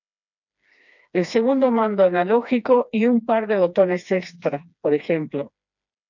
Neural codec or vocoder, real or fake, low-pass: codec, 16 kHz, 2 kbps, FreqCodec, smaller model; fake; 7.2 kHz